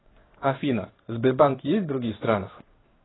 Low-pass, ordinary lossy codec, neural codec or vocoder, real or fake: 7.2 kHz; AAC, 16 kbps; codec, 16 kHz in and 24 kHz out, 1 kbps, XY-Tokenizer; fake